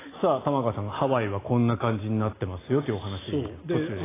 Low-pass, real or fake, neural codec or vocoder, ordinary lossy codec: 3.6 kHz; real; none; AAC, 16 kbps